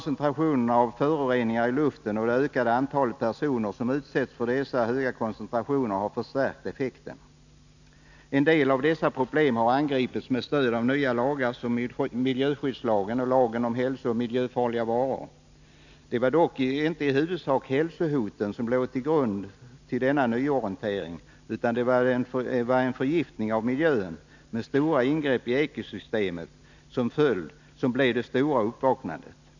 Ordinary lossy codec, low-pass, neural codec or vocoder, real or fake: none; 7.2 kHz; none; real